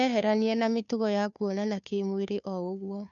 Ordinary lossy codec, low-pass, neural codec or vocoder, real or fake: none; 7.2 kHz; codec, 16 kHz, 2 kbps, FunCodec, trained on Chinese and English, 25 frames a second; fake